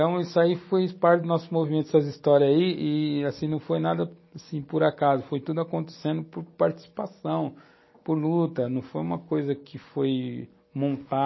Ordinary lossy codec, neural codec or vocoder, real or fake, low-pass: MP3, 24 kbps; none; real; 7.2 kHz